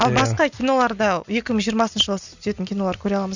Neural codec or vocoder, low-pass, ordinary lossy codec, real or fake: none; 7.2 kHz; none; real